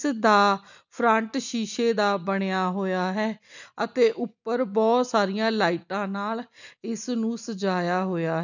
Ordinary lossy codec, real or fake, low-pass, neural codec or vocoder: none; real; 7.2 kHz; none